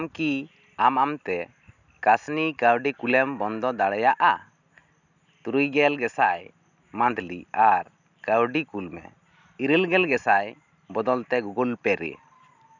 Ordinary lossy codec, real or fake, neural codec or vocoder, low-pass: none; real; none; 7.2 kHz